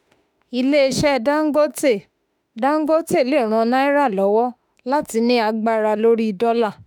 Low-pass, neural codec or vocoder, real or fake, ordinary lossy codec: none; autoencoder, 48 kHz, 32 numbers a frame, DAC-VAE, trained on Japanese speech; fake; none